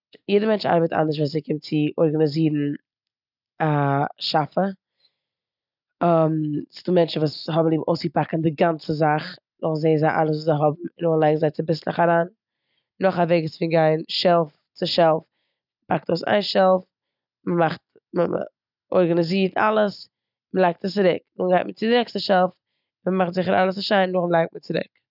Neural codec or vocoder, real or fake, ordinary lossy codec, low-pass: none; real; none; 5.4 kHz